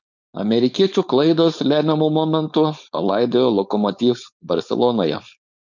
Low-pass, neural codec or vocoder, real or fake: 7.2 kHz; codec, 16 kHz, 4.8 kbps, FACodec; fake